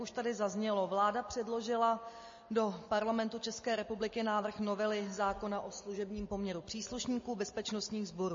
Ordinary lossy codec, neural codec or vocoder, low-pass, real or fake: MP3, 32 kbps; none; 7.2 kHz; real